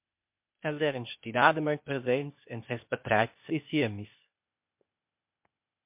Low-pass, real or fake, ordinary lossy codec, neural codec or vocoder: 3.6 kHz; fake; MP3, 32 kbps; codec, 16 kHz, 0.8 kbps, ZipCodec